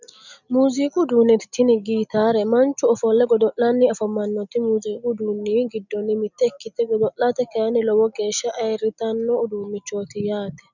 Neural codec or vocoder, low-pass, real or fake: none; 7.2 kHz; real